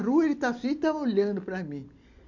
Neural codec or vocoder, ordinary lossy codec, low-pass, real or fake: none; none; 7.2 kHz; real